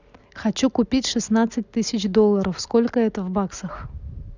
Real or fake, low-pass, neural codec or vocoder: real; 7.2 kHz; none